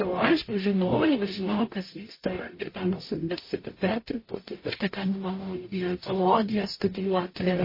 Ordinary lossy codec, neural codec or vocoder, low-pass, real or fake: MP3, 24 kbps; codec, 44.1 kHz, 0.9 kbps, DAC; 5.4 kHz; fake